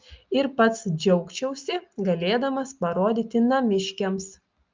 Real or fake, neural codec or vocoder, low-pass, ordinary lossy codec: real; none; 7.2 kHz; Opus, 24 kbps